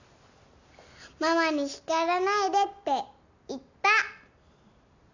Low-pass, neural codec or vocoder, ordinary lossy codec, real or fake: 7.2 kHz; none; none; real